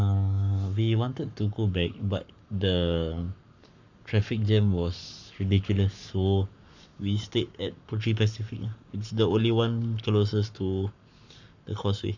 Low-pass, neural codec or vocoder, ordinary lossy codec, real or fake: 7.2 kHz; codec, 44.1 kHz, 7.8 kbps, Pupu-Codec; none; fake